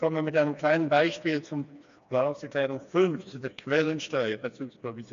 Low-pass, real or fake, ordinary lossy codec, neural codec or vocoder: 7.2 kHz; fake; MP3, 64 kbps; codec, 16 kHz, 2 kbps, FreqCodec, smaller model